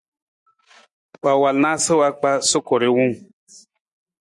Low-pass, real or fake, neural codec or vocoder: 10.8 kHz; real; none